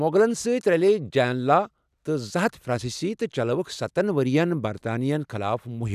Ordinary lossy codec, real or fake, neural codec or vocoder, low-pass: none; real; none; 14.4 kHz